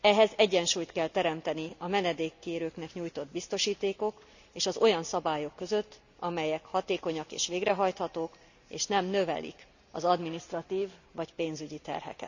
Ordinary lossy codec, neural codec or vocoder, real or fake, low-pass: none; none; real; 7.2 kHz